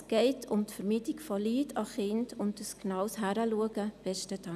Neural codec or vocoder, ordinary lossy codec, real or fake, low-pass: none; none; real; 14.4 kHz